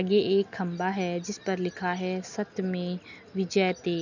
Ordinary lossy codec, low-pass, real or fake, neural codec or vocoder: none; 7.2 kHz; real; none